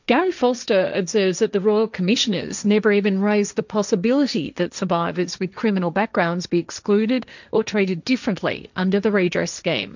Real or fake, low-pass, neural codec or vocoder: fake; 7.2 kHz; codec, 16 kHz, 1.1 kbps, Voila-Tokenizer